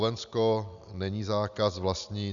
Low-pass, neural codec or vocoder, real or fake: 7.2 kHz; none; real